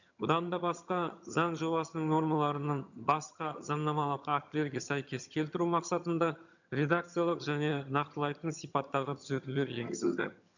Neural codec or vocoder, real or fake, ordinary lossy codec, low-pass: vocoder, 22.05 kHz, 80 mel bands, HiFi-GAN; fake; none; 7.2 kHz